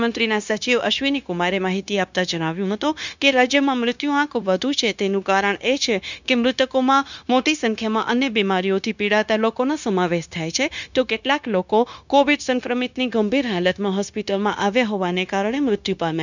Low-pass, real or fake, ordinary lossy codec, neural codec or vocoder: 7.2 kHz; fake; none; codec, 16 kHz, 0.9 kbps, LongCat-Audio-Codec